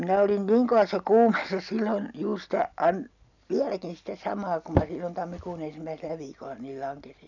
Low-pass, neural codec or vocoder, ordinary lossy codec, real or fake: 7.2 kHz; none; none; real